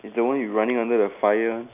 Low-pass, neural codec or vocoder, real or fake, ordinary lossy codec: 3.6 kHz; none; real; AAC, 32 kbps